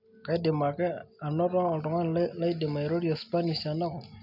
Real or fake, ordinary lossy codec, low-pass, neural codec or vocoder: real; none; 5.4 kHz; none